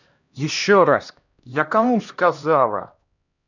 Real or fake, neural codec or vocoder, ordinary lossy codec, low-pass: fake; codec, 16 kHz, 1 kbps, X-Codec, HuBERT features, trained on LibriSpeech; none; 7.2 kHz